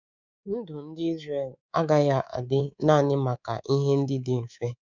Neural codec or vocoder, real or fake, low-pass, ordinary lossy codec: codec, 24 kHz, 3.1 kbps, DualCodec; fake; 7.2 kHz; none